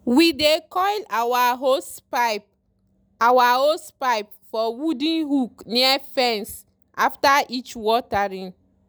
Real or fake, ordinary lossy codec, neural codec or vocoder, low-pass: real; none; none; none